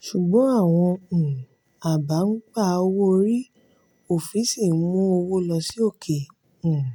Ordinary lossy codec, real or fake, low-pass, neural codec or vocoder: none; real; none; none